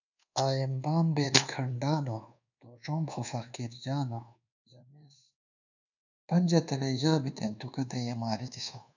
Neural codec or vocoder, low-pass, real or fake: codec, 24 kHz, 1.2 kbps, DualCodec; 7.2 kHz; fake